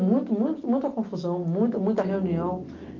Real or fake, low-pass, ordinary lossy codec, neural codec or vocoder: real; 7.2 kHz; Opus, 32 kbps; none